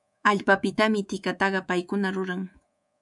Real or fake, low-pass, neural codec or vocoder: fake; 10.8 kHz; codec, 24 kHz, 3.1 kbps, DualCodec